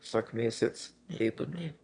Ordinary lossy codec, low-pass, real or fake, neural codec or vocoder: none; 9.9 kHz; fake; autoencoder, 22.05 kHz, a latent of 192 numbers a frame, VITS, trained on one speaker